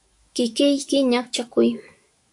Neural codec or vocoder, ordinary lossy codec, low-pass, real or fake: autoencoder, 48 kHz, 128 numbers a frame, DAC-VAE, trained on Japanese speech; AAC, 64 kbps; 10.8 kHz; fake